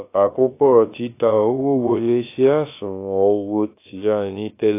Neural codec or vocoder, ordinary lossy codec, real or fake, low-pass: codec, 16 kHz, about 1 kbps, DyCAST, with the encoder's durations; AAC, 24 kbps; fake; 3.6 kHz